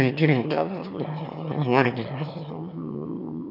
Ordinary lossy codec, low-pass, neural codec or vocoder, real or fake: none; 5.4 kHz; autoencoder, 22.05 kHz, a latent of 192 numbers a frame, VITS, trained on one speaker; fake